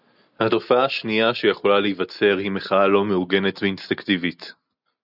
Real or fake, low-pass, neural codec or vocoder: real; 5.4 kHz; none